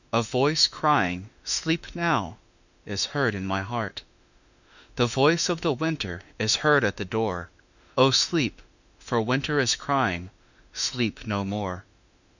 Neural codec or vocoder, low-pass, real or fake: autoencoder, 48 kHz, 32 numbers a frame, DAC-VAE, trained on Japanese speech; 7.2 kHz; fake